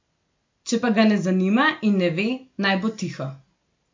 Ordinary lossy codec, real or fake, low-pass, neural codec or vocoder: MP3, 48 kbps; real; 7.2 kHz; none